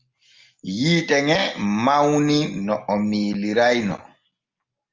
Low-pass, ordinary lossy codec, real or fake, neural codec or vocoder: 7.2 kHz; Opus, 32 kbps; real; none